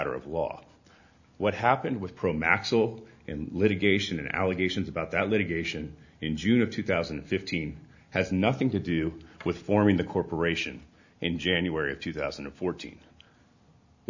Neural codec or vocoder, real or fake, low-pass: none; real; 7.2 kHz